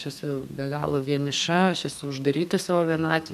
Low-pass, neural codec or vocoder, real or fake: 14.4 kHz; codec, 32 kHz, 1.9 kbps, SNAC; fake